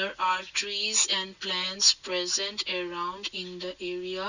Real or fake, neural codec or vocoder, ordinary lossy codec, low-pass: real; none; none; 7.2 kHz